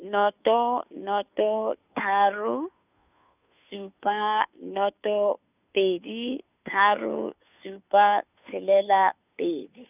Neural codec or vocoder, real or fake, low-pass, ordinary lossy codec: codec, 16 kHz, 2 kbps, FunCodec, trained on Chinese and English, 25 frames a second; fake; 3.6 kHz; none